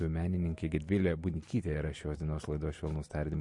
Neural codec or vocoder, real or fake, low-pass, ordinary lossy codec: none; real; 10.8 kHz; MP3, 48 kbps